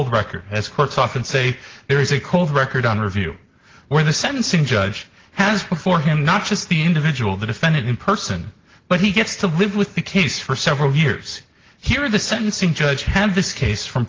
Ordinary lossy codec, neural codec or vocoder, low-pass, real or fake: Opus, 16 kbps; none; 7.2 kHz; real